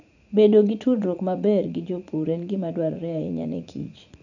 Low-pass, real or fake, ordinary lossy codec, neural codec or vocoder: 7.2 kHz; fake; none; vocoder, 44.1 kHz, 80 mel bands, Vocos